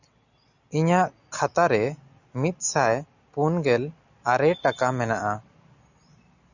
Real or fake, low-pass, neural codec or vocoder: real; 7.2 kHz; none